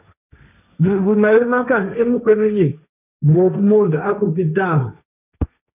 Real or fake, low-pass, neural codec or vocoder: fake; 3.6 kHz; codec, 16 kHz, 1.1 kbps, Voila-Tokenizer